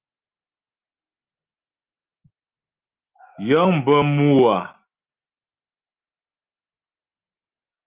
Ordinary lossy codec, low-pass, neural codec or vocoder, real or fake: Opus, 32 kbps; 3.6 kHz; none; real